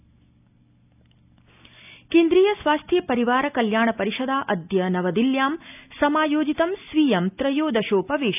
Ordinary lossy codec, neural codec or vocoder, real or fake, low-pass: none; none; real; 3.6 kHz